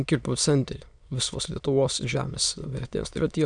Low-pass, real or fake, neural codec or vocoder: 9.9 kHz; fake; autoencoder, 22.05 kHz, a latent of 192 numbers a frame, VITS, trained on many speakers